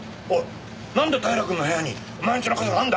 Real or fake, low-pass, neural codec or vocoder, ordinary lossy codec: real; none; none; none